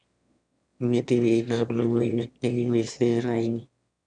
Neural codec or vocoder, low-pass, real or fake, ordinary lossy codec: autoencoder, 22.05 kHz, a latent of 192 numbers a frame, VITS, trained on one speaker; 9.9 kHz; fake; none